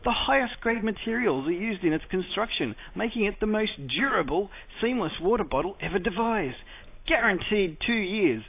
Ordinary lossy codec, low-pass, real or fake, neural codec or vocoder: AAC, 24 kbps; 3.6 kHz; real; none